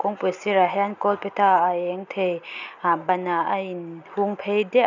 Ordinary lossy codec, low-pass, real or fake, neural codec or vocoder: none; 7.2 kHz; real; none